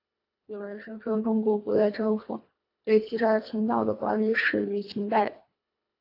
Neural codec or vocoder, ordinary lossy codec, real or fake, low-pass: codec, 24 kHz, 1.5 kbps, HILCodec; AAC, 32 kbps; fake; 5.4 kHz